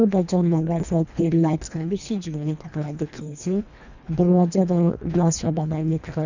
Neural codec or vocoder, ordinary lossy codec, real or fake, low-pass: codec, 24 kHz, 1.5 kbps, HILCodec; none; fake; 7.2 kHz